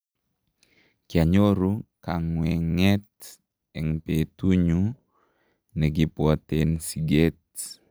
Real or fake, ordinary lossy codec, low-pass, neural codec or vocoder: real; none; none; none